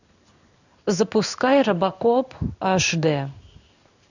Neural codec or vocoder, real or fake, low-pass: codec, 16 kHz in and 24 kHz out, 1 kbps, XY-Tokenizer; fake; 7.2 kHz